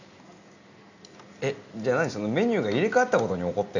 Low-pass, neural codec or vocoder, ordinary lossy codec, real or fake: 7.2 kHz; none; none; real